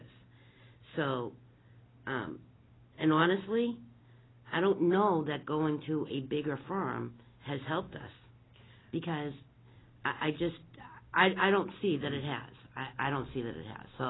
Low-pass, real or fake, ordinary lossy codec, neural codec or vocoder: 7.2 kHz; real; AAC, 16 kbps; none